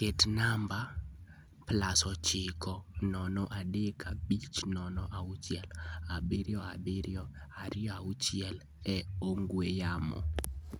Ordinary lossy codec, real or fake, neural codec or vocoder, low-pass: none; real; none; none